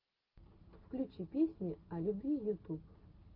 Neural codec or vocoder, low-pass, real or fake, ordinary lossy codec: none; 5.4 kHz; real; Opus, 24 kbps